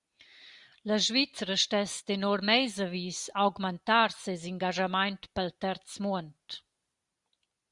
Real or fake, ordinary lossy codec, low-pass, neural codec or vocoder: real; Opus, 64 kbps; 10.8 kHz; none